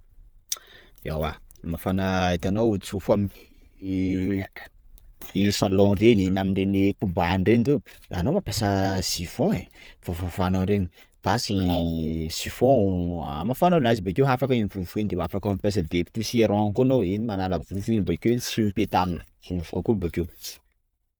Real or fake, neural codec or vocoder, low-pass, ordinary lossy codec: fake; vocoder, 44.1 kHz, 128 mel bands every 512 samples, BigVGAN v2; none; none